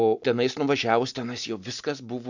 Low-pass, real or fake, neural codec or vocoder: 7.2 kHz; real; none